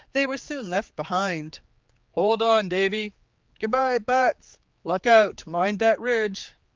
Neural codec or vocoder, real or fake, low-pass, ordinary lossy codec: codec, 16 kHz, 4 kbps, X-Codec, HuBERT features, trained on general audio; fake; 7.2 kHz; Opus, 24 kbps